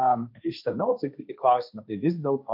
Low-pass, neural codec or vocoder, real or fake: 5.4 kHz; codec, 16 kHz, 1.1 kbps, Voila-Tokenizer; fake